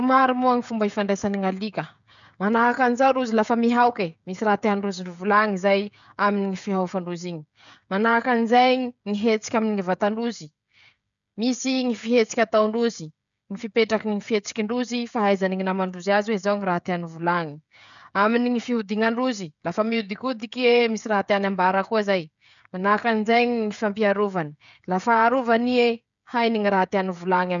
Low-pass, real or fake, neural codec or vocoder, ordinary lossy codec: 7.2 kHz; fake; codec, 16 kHz, 16 kbps, FreqCodec, smaller model; none